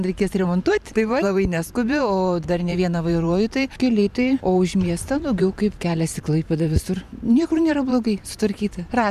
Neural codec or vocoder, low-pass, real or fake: vocoder, 44.1 kHz, 128 mel bands every 512 samples, BigVGAN v2; 14.4 kHz; fake